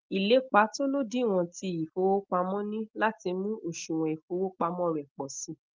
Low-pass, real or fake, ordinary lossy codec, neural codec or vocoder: 7.2 kHz; real; Opus, 24 kbps; none